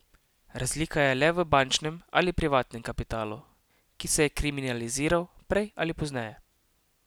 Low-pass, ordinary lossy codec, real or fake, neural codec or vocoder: none; none; real; none